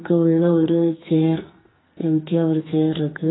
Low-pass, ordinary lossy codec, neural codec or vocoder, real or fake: 7.2 kHz; AAC, 16 kbps; codec, 44.1 kHz, 2.6 kbps, SNAC; fake